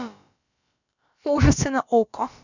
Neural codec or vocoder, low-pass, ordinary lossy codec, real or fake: codec, 16 kHz, about 1 kbps, DyCAST, with the encoder's durations; 7.2 kHz; none; fake